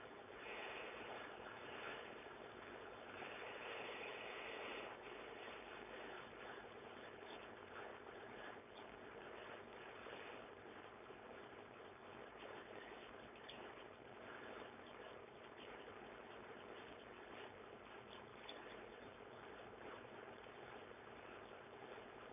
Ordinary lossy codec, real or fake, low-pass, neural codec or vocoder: none; fake; 3.6 kHz; codec, 16 kHz, 4.8 kbps, FACodec